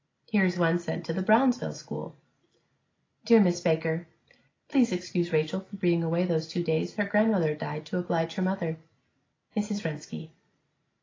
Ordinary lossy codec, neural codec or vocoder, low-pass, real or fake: AAC, 32 kbps; none; 7.2 kHz; real